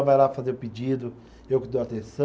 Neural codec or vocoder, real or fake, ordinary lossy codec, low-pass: none; real; none; none